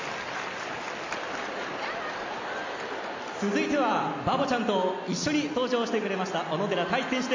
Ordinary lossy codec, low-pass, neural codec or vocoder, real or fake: MP3, 48 kbps; 7.2 kHz; none; real